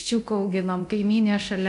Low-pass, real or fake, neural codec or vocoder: 10.8 kHz; fake; codec, 24 kHz, 0.9 kbps, DualCodec